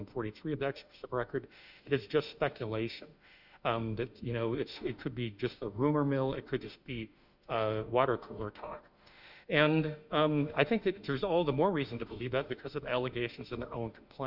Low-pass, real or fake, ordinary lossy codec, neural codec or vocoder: 5.4 kHz; fake; Opus, 64 kbps; autoencoder, 48 kHz, 32 numbers a frame, DAC-VAE, trained on Japanese speech